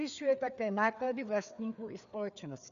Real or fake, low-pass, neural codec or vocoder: fake; 7.2 kHz; codec, 16 kHz, 4 kbps, FreqCodec, larger model